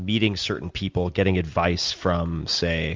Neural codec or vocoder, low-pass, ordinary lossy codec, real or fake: none; 7.2 kHz; Opus, 32 kbps; real